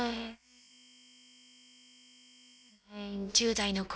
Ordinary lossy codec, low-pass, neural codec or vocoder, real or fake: none; none; codec, 16 kHz, about 1 kbps, DyCAST, with the encoder's durations; fake